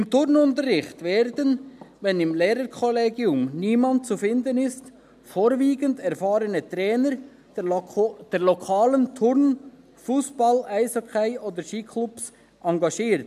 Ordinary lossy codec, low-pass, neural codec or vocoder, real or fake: none; 14.4 kHz; none; real